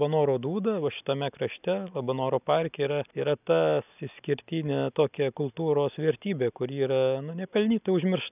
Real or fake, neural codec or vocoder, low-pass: real; none; 3.6 kHz